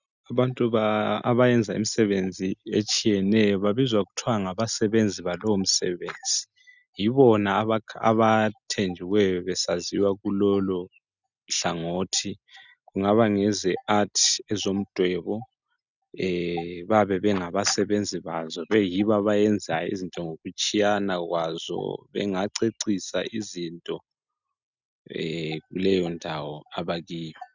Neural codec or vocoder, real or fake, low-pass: none; real; 7.2 kHz